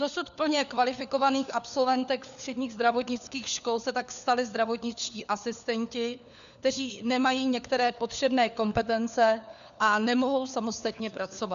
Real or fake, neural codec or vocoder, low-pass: fake; codec, 16 kHz, 4 kbps, FunCodec, trained on LibriTTS, 50 frames a second; 7.2 kHz